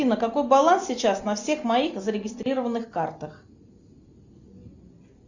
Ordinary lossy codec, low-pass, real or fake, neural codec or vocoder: Opus, 64 kbps; 7.2 kHz; real; none